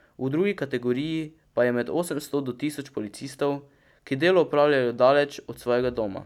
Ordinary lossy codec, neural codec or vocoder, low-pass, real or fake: none; none; 19.8 kHz; real